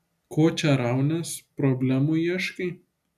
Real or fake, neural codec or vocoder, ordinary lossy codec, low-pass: real; none; AAC, 96 kbps; 14.4 kHz